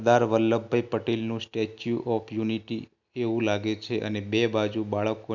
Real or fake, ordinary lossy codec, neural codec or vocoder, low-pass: real; none; none; 7.2 kHz